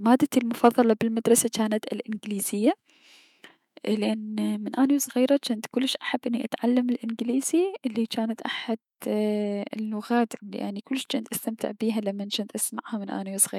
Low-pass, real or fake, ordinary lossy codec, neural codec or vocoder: 19.8 kHz; fake; none; autoencoder, 48 kHz, 128 numbers a frame, DAC-VAE, trained on Japanese speech